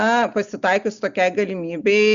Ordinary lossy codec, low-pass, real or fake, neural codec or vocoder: Opus, 64 kbps; 7.2 kHz; real; none